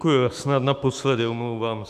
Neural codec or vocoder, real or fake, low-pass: autoencoder, 48 kHz, 128 numbers a frame, DAC-VAE, trained on Japanese speech; fake; 14.4 kHz